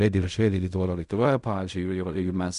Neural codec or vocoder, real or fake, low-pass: codec, 16 kHz in and 24 kHz out, 0.4 kbps, LongCat-Audio-Codec, fine tuned four codebook decoder; fake; 10.8 kHz